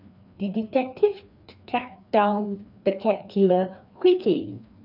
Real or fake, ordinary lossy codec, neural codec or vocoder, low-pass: fake; none; codec, 16 kHz, 2 kbps, FreqCodec, larger model; 5.4 kHz